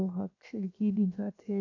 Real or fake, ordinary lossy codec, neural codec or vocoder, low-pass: fake; AAC, 48 kbps; codec, 16 kHz, 0.7 kbps, FocalCodec; 7.2 kHz